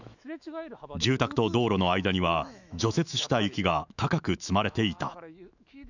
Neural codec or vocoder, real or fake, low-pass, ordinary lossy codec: autoencoder, 48 kHz, 128 numbers a frame, DAC-VAE, trained on Japanese speech; fake; 7.2 kHz; none